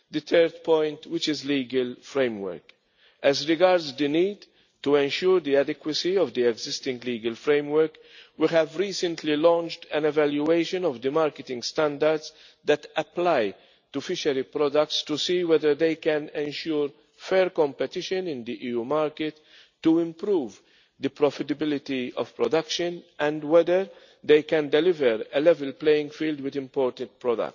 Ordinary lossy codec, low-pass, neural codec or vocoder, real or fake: none; 7.2 kHz; none; real